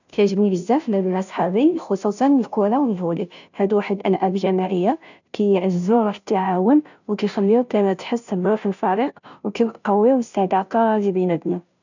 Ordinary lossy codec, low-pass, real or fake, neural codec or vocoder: none; 7.2 kHz; fake; codec, 16 kHz, 0.5 kbps, FunCodec, trained on Chinese and English, 25 frames a second